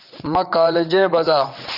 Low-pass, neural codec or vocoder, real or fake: 5.4 kHz; vocoder, 22.05 kHz, 80 mel bands, WaveNeXt; fake